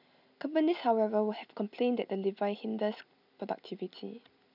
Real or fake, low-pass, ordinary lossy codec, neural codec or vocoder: real; 5.4 kHz; none; none